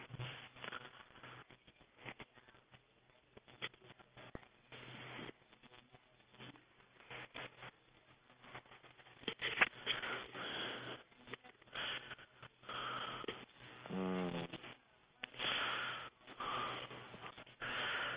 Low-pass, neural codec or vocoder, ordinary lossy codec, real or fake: 3.6 kHz; none; Opus, 24 kbps; real